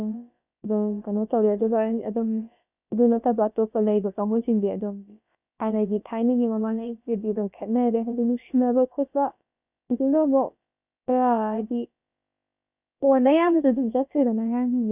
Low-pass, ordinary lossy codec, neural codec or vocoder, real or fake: 3.6 kHz; none; codec, 16 kHz, about 1 kbps, DyCAST, with the encoder's durations; fake